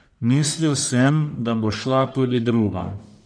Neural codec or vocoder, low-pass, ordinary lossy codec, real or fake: codec, 44.1 kHz, 1.7 kbps, Pupu-Codec; 9.9 kHz; none; fake